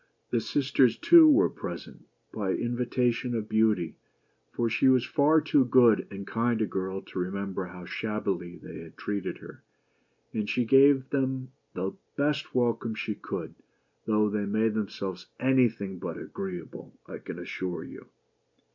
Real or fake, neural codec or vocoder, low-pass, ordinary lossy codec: real; none; 7.2 kHz; MP3, 64 kbps